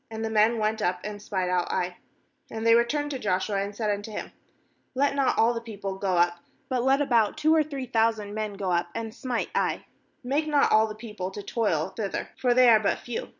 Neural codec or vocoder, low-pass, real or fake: none; 7.2 kHz; real